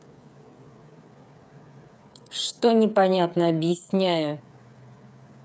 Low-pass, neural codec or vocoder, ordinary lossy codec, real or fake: none; codec, 16 kHz, 8 kbps, FreqCodec, smaller model; none; fake